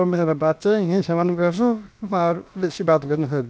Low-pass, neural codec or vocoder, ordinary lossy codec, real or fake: none; codec, 16 kHz, about 1 kbps, DyCAST, with the encoder's durations; none; fake